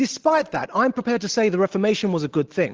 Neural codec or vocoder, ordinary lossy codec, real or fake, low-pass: none; Opus, 16 kbps; real; 7.2 kHz